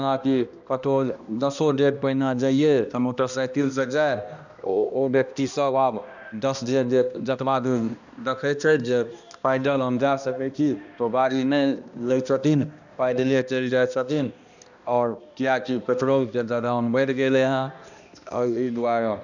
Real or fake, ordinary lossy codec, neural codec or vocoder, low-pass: fake; none; codec, 16 kHz, 1 kbps, X-Codec, HuBERT features, trained on balanced general audio; 7.2 kHz